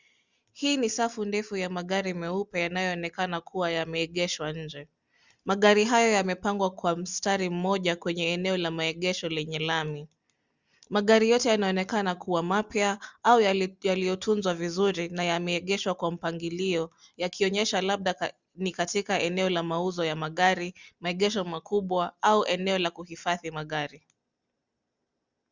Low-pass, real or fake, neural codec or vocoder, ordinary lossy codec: 7.2 kHz; real; none; Opus, 64 kbps